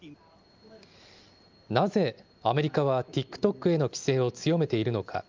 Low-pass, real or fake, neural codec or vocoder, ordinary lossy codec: 7.2 kHz; real; none; Opus, 24 kbps